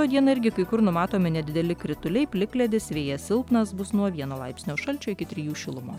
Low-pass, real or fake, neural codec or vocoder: 19.8 kHz; real; none